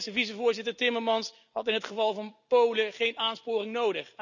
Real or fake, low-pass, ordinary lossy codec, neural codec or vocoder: real; 7.2 kHz; none; none